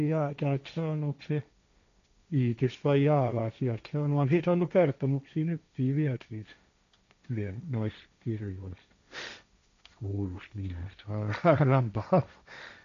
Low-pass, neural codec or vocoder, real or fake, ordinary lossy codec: 7.2 kHz; codec, 16 kHz, 1.1 kbps, Voila-Tokenizer; fake; AAC, 96 kbps